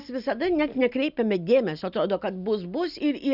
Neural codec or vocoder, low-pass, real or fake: none; 5.4 kHz; real